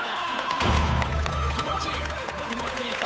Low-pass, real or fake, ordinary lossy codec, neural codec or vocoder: none; fake; none; codec, 16 kHz, 8 kbps, FunCodec, trained on Chinese and English, 25 frames a second